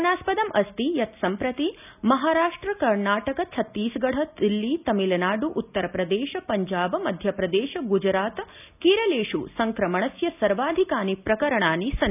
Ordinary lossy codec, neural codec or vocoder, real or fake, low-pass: none; none; real; 3.6 kHz